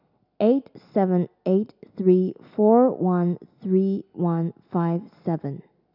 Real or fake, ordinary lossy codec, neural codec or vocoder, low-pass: real; none; none; 5.4 kHz